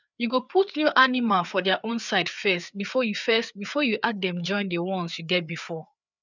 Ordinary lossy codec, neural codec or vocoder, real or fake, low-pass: none; codec, 16 kHz, 4 kbps, FreqCodec, larger model; fake; 7.2 kHz